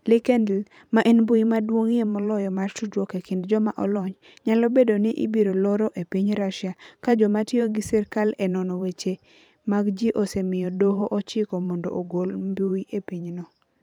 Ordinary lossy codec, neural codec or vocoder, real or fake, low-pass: none; vocoder, 44.1 kHz, 128 mel bands every 512 samples, BigVGAN v2; fake; 19.8 kHz